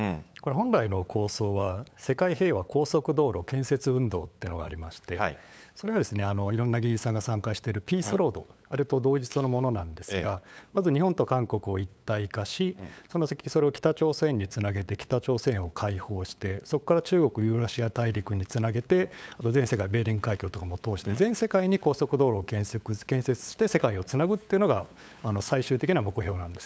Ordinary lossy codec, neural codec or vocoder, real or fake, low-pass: none; codec, 16 kHz, 8 kbps, FunCodec, trained on LibriTTS, 25 frames a second; fake; none